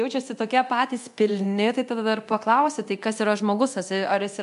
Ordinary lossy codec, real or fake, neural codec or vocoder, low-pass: MP3, 64 kbps; fake; codec, 24 kHz, 0.9 kbps, DualCodec; 10.8 kHz